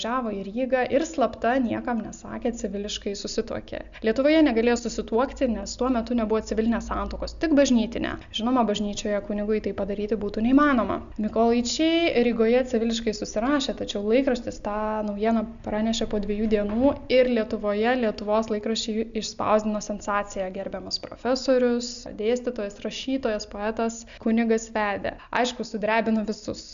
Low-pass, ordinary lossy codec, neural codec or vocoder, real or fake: 7.2 kHz; MP3, 96 kbps; none; real